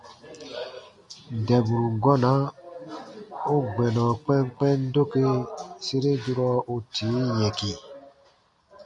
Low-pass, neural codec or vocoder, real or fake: 10.8 kHz; none; real